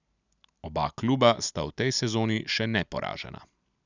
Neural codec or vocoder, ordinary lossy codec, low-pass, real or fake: none; none; 7.2 kHz; real